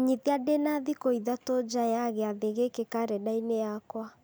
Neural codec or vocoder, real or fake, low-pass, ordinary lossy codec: none; real; none; none